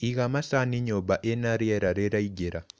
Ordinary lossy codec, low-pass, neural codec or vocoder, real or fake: none; none; none; real